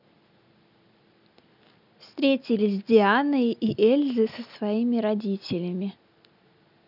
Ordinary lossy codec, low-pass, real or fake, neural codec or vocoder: none; 5.4 kHz; real; none